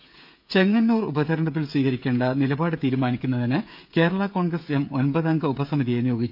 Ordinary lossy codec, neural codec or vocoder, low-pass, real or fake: none; codec, 16 kHz, 16 kbps, FreqCodec, smaller model; 5.4 kHz; fake